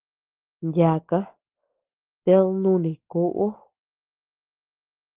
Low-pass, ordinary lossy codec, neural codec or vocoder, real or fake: 3.6 kHz; Opus, 16 kbps; none; real